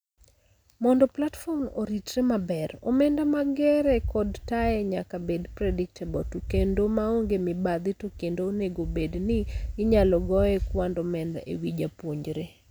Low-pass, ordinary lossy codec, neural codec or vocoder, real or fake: none; none; none; real